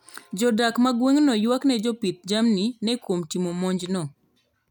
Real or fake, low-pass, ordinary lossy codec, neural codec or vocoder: real; 19.8 kHz; none; none